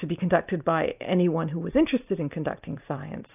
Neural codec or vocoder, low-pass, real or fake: vocoder, 44.1 kHz, 128 mel bands every 256 samples, BigVGAN v2; 3.6 kHz; fake